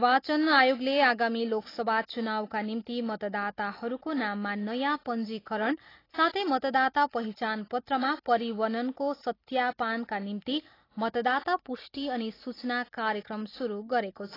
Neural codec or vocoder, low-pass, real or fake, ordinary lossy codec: none; 5.4 kHz; real; AAC, 24 kbps